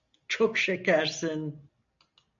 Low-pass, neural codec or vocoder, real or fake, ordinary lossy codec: 7.2 kHz; none; real; AAC, 64 kbps